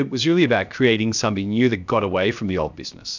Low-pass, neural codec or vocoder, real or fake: 7.2 kHz; codec, 16 kHz, 0.7 kbps, FocalCodec; fake